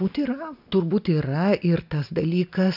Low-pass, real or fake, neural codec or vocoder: 5.4 kHz; real; none